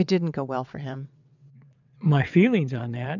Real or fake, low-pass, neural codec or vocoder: fake; 7.2 kHz; vocoder, 22.05 kHz, 80 mel bands, WaveNeXt